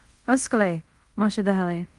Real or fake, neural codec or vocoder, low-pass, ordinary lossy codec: fake; codec, 24 kHz, 0.5 kbps, DualCodec; 10.8 kHz; Opus, 24 kbps